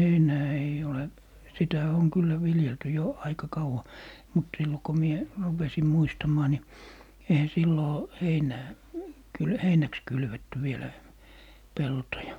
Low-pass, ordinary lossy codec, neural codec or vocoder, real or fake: 19.8 kHz; none; none; real